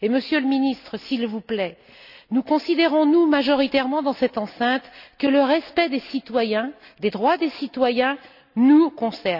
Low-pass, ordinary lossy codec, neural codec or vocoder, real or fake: 5.4 kHz; none; none; real